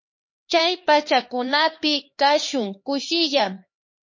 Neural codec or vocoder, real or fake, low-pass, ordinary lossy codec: codec, 16 kHz, 2 kbps, X-Codec, HuBERT features, trained on LibriSpeech; fake; 7.2 kHz; MP3, 32 kbps